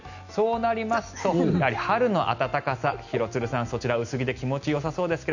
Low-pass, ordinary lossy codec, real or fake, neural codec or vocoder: 7.2 kHz; none; real; none